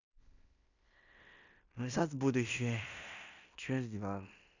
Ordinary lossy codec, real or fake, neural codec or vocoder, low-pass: none; fake; codec, 16 kHz in and 24 kHz out, 0.9 kbps, LongCat-Audio-Codec, fine tuned four codebook decoder; 7.2 kHz